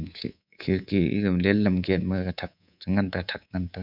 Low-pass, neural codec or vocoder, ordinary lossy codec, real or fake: 5.4 kHz; codec, 24 kHz, 3.1 kbps, DualCodec; none; fake